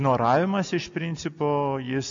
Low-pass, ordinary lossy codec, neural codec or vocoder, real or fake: 7.2 kHz; MP3, 48 kbps; none; real